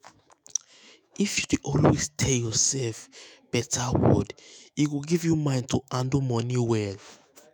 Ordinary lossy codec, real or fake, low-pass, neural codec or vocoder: none; fake; none; autoencoder, 48 kHz, 128 numbers a frame, DAC-VAE, trained on Japanese speech